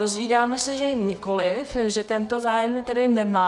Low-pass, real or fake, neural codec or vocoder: 10.8 kHz; fake; codec, 24 kHz, 0.9 kbps, WavTokenizer, medium music audio release